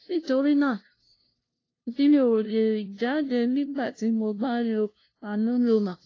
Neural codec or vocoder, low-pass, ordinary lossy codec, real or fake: codec, 16 kHz, 0.5 kbps, FunCodec, trained on LibriTTS, 25 frames a second; 7.2 kHz; AAC, 32 kbps; fake